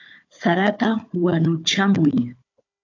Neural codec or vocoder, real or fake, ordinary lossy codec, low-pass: codec, 16 kHz, 4 kbps, FunCodec, trained on Chinese and English, 50 frames a second; fake; AAC, 48 kbps; 7.2 kHz